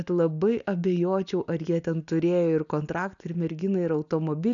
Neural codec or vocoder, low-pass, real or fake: none; 7.2 kHz; real